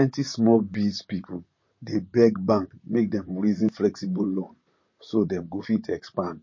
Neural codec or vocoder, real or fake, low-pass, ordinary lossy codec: vocoder, 44.1 kHz, 128 mel bands, Pupu-Vocoder; fake; 7.2 kHz; MP3, 32 kbps